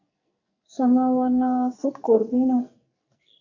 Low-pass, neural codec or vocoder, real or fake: 7.2 kHz; codec, 44.1 kHz, 2.6 kbps, SNAC; fake